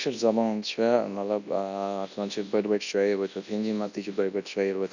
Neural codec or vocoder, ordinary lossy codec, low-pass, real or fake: codec, 24 kHz, 0.9 kbps, WavTokenizer, large speech release; none; 7.2 kHz; fake